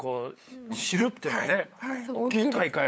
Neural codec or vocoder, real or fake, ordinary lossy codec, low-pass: codec, 16 kHz, 8 kbps, FunCodec, trained on LibriTTS, 25 frames a second; fake; none; none